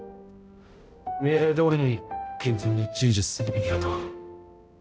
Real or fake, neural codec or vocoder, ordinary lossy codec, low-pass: fake; codec, 16 kHz, 0.5 kbps, X-Codec, HuBERT features, trained on balanced general audio; none; none